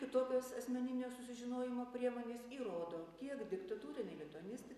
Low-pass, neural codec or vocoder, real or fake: 14.4 kHz; none; real